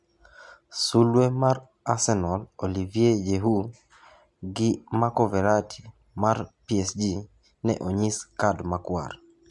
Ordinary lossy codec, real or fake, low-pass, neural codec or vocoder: MP3, 64 kbps; real; 10.8 kHz; none